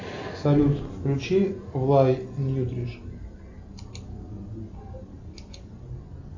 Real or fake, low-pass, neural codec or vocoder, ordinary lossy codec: real; 7.2 kHz; none; AAC, 48 kbps